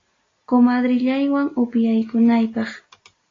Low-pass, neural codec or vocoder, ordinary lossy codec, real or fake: 7.2 kHz; none; AAC, 32 kbps; real